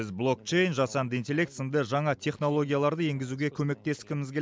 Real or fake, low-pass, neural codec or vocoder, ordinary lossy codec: real; none; none; none